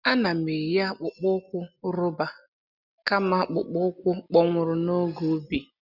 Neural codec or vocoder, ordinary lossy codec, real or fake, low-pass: none; none; real; 5.4 kHz